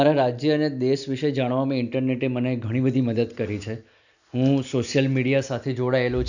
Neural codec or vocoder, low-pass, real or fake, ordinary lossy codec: none; 7.2 kHz; real; none